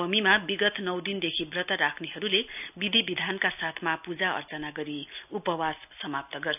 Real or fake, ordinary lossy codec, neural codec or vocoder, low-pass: real; none; none; 3.6 kHz